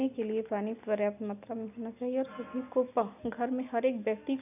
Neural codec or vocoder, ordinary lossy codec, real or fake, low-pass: none; none; real; 3.6 kHz